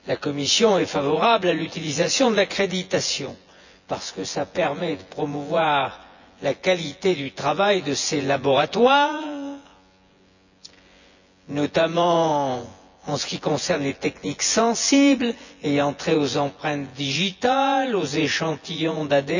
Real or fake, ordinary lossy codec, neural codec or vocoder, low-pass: fake; none; vocoder, 24 kHz, 100 mel bands, Vocos; 7.2 kHz